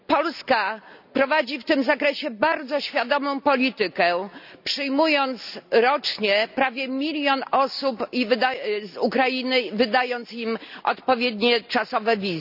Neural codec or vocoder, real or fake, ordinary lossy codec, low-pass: none; real; none; 5.4 kHz